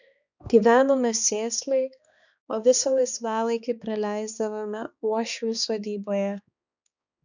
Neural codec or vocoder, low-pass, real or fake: codec, 16 kHz, 2 kbps, X-Codec, HuBERT features, trained on balanced general audio; 7.2 kHz; fake